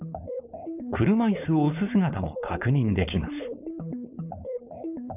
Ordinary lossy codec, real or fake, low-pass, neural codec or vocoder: none; fake; 3.6 kHz; codec, 16 kHz, 4.8 kbps, FACodec